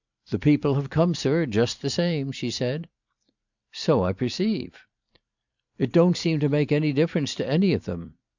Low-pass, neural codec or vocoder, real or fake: 7.2 kHz; vocoder, 44.1 kHz, 128 mel bands every 512 samples, BigVGAN v2; fake